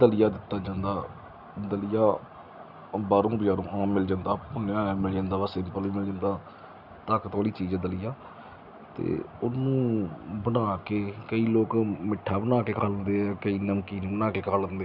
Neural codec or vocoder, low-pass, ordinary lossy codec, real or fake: none; 5.4 kHz; Opus, 64 kbps; real